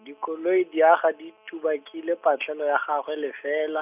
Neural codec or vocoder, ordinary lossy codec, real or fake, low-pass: none; none; real; 3.6 kHz